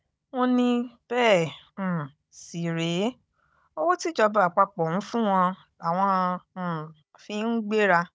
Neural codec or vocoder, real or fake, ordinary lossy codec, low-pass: codec, 16 kHz, 16 kbps, FunCodec, trained on Chinese and English, 50 frames a second; fake; none; none